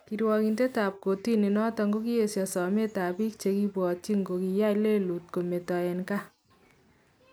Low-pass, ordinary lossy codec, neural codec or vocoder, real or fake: none; none; none; real